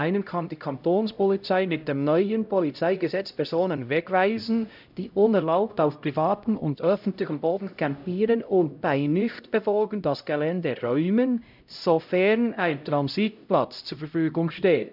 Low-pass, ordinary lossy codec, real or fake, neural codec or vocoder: 5.4 kHz; none; fake; codec, 16 kHz, 0.5 kbps, X-Codec, HuBERT features, trained on LibriSpeech